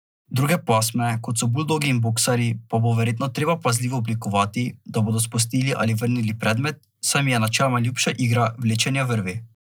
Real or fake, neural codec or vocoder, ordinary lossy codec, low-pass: real; none; none; none